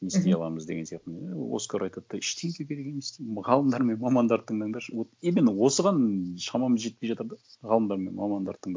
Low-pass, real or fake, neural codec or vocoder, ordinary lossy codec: none; real; none; none